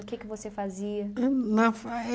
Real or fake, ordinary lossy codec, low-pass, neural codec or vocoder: real; none; none; none